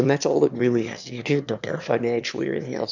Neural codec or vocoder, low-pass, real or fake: autoencoder, 22.05 kHz, a latent of 192 numbers a frame, VITS, trained on one speaker; 7.2 kHz; fake